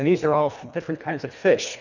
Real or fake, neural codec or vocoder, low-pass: fake; codec, 24 kHz, 1.5 kbps, HILCodec; 7.2 kHz